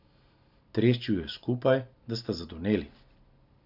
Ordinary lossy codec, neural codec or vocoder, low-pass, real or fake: none; none; 5.4 kHz; real